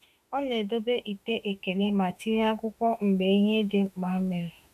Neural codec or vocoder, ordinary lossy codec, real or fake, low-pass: autoencoder, 48 kHz, 32 numbers a frame, DAC-VAE, trained on Japanese speech; none; fake; 14.4 kHz